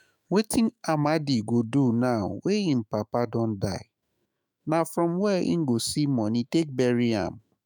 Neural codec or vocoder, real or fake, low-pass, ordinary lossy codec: autoencoder, 48 kHz, 128 numbers a frame, DAC-VAE, trained on Japanese speech; fake; none; none